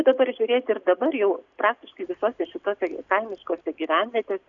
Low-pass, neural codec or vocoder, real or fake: 7.2 kHz; none; real